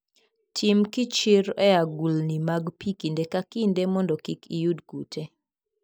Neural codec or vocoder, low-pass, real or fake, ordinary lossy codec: none; none; real; none